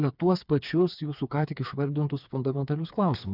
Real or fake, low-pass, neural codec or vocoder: fake; 5.4 kHz; codec, 16 kHz, 4 kbps, FreqCodec, smaller model